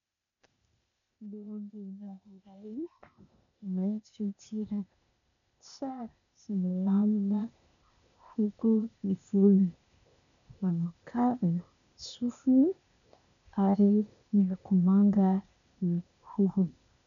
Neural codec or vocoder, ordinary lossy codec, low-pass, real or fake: codec, 16 kHz, 0.8 kbps, ZipCodec; MP3, 96 kbps; 7.2 kHz; fake